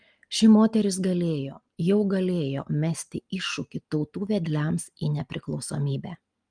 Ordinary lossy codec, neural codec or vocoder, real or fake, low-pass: Opus, 32 kbps; none; real; 9.9 kHz